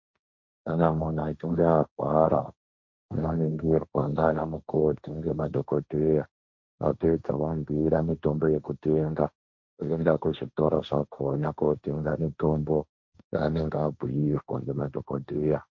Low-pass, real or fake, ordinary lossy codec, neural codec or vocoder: 7.2 kHz; fake; MP3, 48 kbps; codec, 16 kHz, 1.1 kbps, Voila-Tokenizer